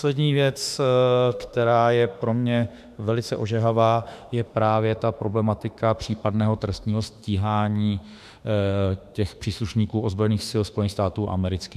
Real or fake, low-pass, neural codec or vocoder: fake; 14.4 kHz; autoencoder, 48 kHz, 32 numbers a frame, DAC-VAE, trained on Japanese speech